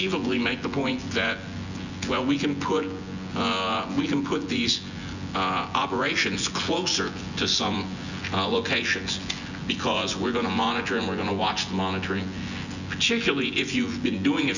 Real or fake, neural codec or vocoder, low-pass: fake; vocoder, 24 kHz, 100 mel bands, Vocos; 7.2 kHz